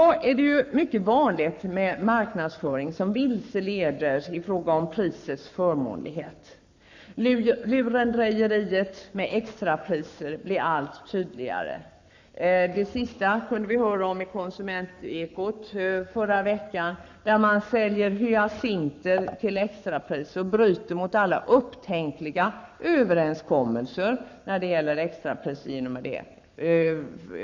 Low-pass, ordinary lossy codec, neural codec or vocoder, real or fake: 7.2 kHz; none; codec, 44.1 kHz, 7.8 kbps, Pupu-Codec; fake